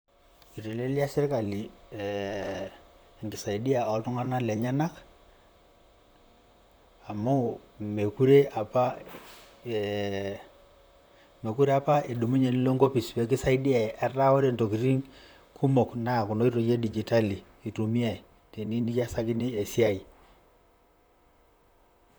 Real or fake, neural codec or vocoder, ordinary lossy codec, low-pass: fake; vocoder, 44.1 kHz, 128 mel bands, Pupu-Vocoder; none; none